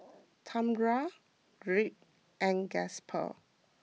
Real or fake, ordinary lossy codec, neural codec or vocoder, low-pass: real; none; none; none